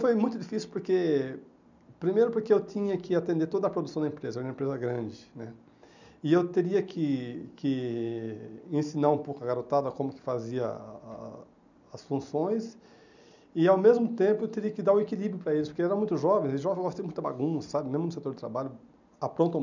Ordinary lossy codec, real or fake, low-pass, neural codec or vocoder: none; real; 7.2 kHz; none